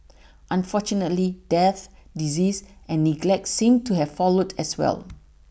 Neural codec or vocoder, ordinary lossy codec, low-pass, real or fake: none; none; none; real